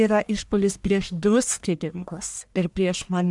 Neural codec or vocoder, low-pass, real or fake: codec, 44.1 kHz, 1.7 kbps, Pupu-Codec; 10.8 kHz; fake